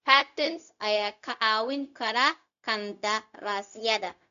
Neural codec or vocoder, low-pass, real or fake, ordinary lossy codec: codec, 16 kHz, 0.4 kbps, LongCat-Audio-Codec; 7.2 kHz; fake; none